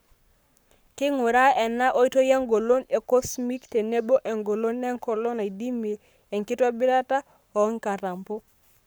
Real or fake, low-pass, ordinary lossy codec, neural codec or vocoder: fake; none; none; codec, 44.1 kHz, 7.8 kbps, Pupu-Codec